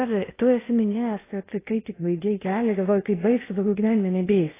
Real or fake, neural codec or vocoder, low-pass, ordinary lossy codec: fake; codec, 16 kHz in and 24 kHz out, 0.8 kbps, FocalCodec, streaming, 65536 codes; 3.6 kHz; AAC, 16 kbps